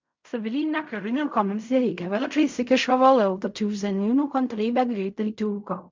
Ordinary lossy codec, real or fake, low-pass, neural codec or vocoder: MP3, 64 kbps; fake; 7.2 kHz; codec, 16 kHz in and 24 kHz out, 0.4 kbps, LongCat-Audio-Codec, fine tuned four codebook decoder